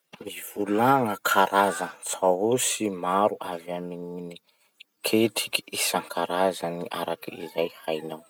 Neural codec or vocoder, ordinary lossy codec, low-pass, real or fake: none; none; none; real